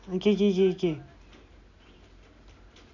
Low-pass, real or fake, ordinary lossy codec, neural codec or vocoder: 7.2 kHz; real; none; none